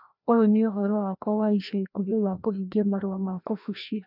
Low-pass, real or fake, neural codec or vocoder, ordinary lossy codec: 5.4 kHz; fake; codec, 16 kHz, 1 kbps, FreqCodec, larger model; none